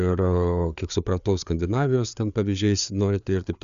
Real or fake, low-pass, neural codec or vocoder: fake; 7.2 kHz; codec, 16 kHz, 4 kbps, FreqCodec, larger model